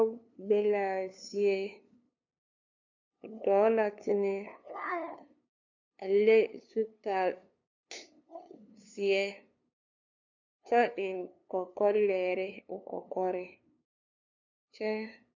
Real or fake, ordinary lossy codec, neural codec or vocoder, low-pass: fake; MP3, 64 kbps; codec, 16 kHz, 2 kbps, FunCodec, trained on LibriTTS, 25 frames a second; 7.2 kHz